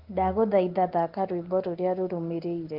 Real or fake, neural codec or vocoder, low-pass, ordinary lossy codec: real; none; 5.4 kHz; Opus, 16 kbps